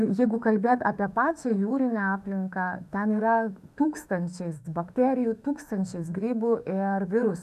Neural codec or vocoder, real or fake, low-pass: autoencoder, 48 kHz, 32 numbers a frame, DAC-VAE, trained on Japanese speech; fake; 14.4 kHz